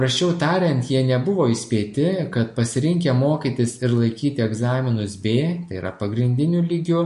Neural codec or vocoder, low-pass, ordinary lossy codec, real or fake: none; 14.4 kHz; MP3, 48 kbps; real